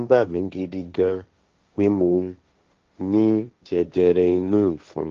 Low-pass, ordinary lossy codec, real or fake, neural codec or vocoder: 7.2 kHz; Opus, 32 kbps; fake; codec, 16 kHz, 1.1 kbps, Voila-Tokenizer